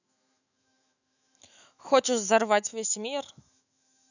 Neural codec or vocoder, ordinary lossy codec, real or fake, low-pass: autoencoder, 48 kHz, 128 numbers a frame, DAC-VAE, trained on Japanese speech; none; fake; 7.2 kHz